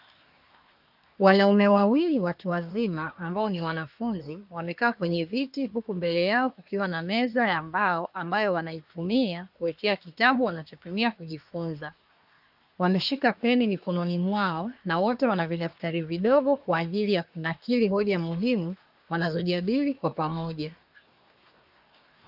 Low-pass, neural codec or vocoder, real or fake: 5.4 kHz; codec, 24 kHz, 1 kbps, SNAC; fake